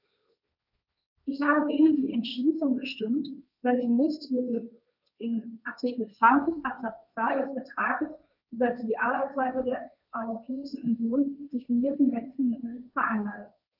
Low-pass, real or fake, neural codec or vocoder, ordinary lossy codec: 5.4 kHz; fake; codec, 16 kHz, 1.1 kbps, Voila-Tokenizer; none